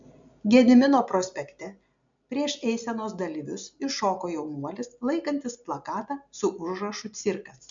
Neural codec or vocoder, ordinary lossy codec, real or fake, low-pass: none; MP3, 64 kbps; real; 7.2 kHz